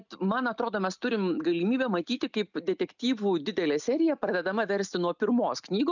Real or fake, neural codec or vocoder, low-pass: real; none; 7.2 kHz